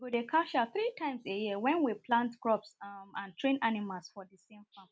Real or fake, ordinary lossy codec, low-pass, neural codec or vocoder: real; none; none; none